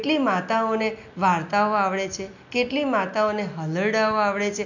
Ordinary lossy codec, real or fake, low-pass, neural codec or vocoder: none; real; 7.2 kHz; none